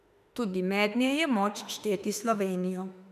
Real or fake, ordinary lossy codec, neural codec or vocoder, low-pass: fake; none; autoencoder, 48 kHz, 32 numbers a frame, DAC-VAE, trained on Japanese speech; 14.4 kHz